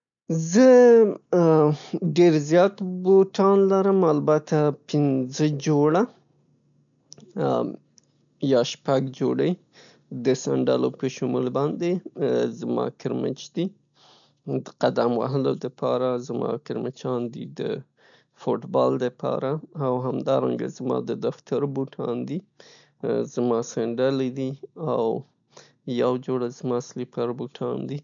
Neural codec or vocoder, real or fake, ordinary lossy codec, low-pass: none; real; none; 7.2 kHz